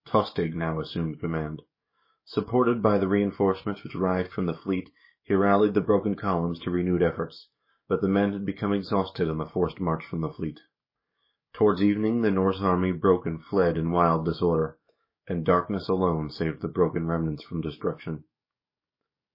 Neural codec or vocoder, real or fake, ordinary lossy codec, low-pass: codec, 44.1 kHz, 7.8 kbps, DAC; fake; MP3, 24 kbps; 5.4 kHz